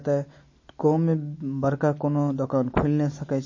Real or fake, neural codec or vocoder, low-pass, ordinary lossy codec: real; none; 7.2 kHz; MP3, 32 kbps